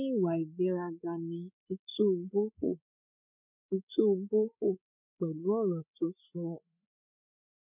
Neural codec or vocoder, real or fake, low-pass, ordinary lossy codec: codec, 16 kHz, 16 kbps, FreqCodec, larger model; fake; 3.6 kHz; none